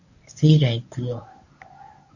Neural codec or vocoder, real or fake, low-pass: codec, 24 kHz, 0.9 kbps, WavTokenizer, medium speech release version 1; fake; 7.2 kHz